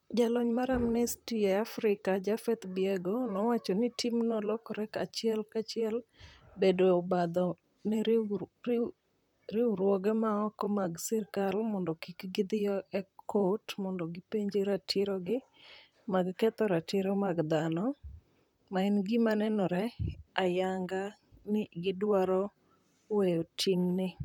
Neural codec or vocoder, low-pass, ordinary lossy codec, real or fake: vocoder, 44.1 kHz, 128 mel bands, Pupu-Vocoder; 19.8 kHz; none; fake